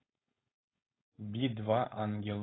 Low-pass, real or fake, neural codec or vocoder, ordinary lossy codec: 7.2 kHz; fake; codec, 16 kHz, 4.8 kbps, FACodec; AAC, 16 kbps